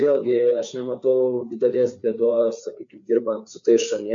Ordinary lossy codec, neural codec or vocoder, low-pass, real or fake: MP3, 64 kbps; codec, 16 kHz, 4 kbps, FreqCodec, larger model; 7.2 kHz; fake